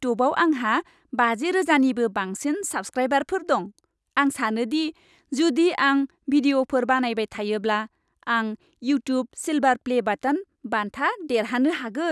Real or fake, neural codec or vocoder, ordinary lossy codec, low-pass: real; none; none; none